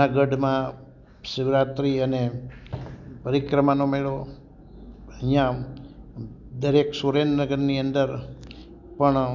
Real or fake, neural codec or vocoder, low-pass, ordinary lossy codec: real; none; 7.2 kHz; none